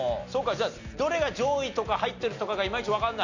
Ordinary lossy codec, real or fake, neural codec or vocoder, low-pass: none; real; none; 7.2 kHz